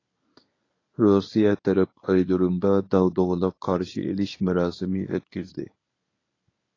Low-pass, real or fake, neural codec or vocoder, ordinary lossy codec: 7.2 kHz; fake; codec, 24 kHz, 0.9 kbps, WavTokenizer, medium speech release version 1; AAC, 32 kbps